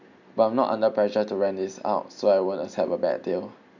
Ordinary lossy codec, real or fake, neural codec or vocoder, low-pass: none; real; none; 7.2 kHz